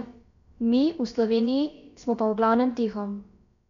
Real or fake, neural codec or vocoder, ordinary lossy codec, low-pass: fake; codec, 16 kHz, about 1 kbps, DyCAST, with the encoder's durations; MP3, 64 kbps; 7.2 kHz